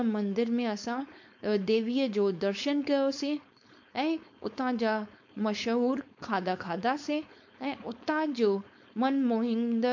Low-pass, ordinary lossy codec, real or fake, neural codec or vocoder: 7.2 kHz; MP3, 48 kbps; fake; codec, 16 kHz, 4.8 kbps, FACodec